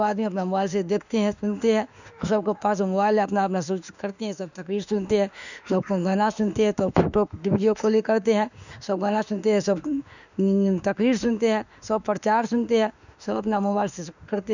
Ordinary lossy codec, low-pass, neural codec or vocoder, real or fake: none; 7.2 kHz; autoencoder, 48 kHz, 32 numbers a frame, DAC-VAE, trained on Japanese speech; fake